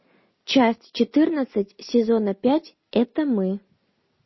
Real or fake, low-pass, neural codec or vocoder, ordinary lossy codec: real; 7.2 kHz; none; MP3, 24 kbps